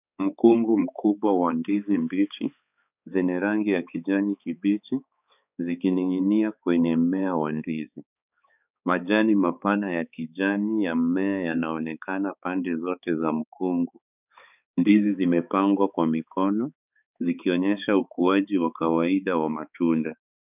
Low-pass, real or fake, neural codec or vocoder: 3.6 kHz; fake; codec, 16 kHz, 4 kbps, X-Codec, HuBERT features, trained on balanced general audio